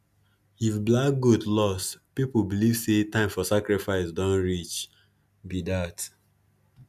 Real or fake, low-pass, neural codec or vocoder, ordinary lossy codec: real; 14.4 kHz; none; none